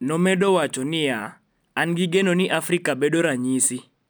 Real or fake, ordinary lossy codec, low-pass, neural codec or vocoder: real; none; none; none